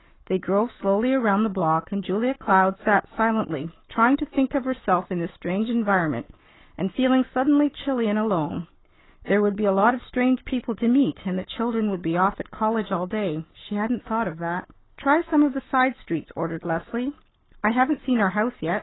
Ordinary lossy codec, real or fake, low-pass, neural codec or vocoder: AAC, 16 kbps; fake; 7.2 kHz; codec, 44.1 kHz, 7.8 kbps, Pupu-Codec